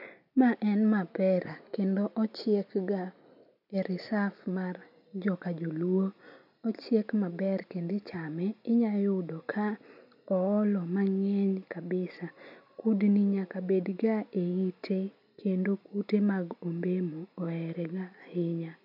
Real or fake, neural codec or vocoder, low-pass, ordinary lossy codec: real; none; 5.4 kHz; none